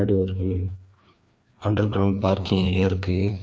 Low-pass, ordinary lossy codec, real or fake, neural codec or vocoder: none; none; fake; codec, 16 kHz, 2 kbps, FreqCodec, larger model